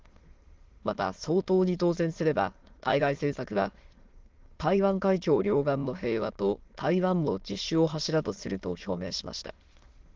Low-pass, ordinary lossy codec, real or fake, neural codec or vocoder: 7.2 kHz; Opus, 16 kbps; fake; autoencoder, 22.05 kHz, a latent of 192 numbers a frame, VITS, trained on many speakers